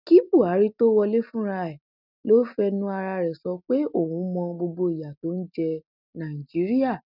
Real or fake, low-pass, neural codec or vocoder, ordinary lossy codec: real; 5.4 kHz; none; none